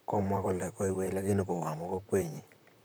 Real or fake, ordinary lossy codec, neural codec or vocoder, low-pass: fake; none; vocoder, 44.1 kHz, 128 mel bands, Pupu-Vocoder; none